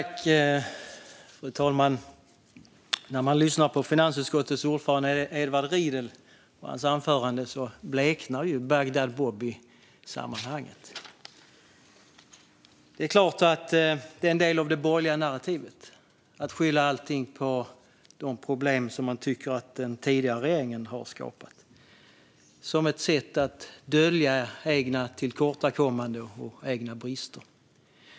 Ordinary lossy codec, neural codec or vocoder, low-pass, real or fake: none; none; none; real